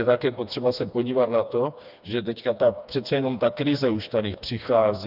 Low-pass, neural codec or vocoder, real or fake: 5.4 kHz; codec, 16 kHz, 2 kbps, FreqCodec, smaller model; fake